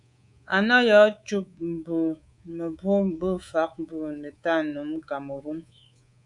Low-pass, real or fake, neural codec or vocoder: 10.8 kHz; fake; codec, 24 kHz, 3.1 kbps, DualCodec